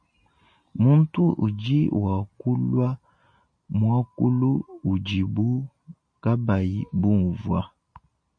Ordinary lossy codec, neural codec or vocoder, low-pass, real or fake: MP3, 48 kbps; none; 9.9 kHz; real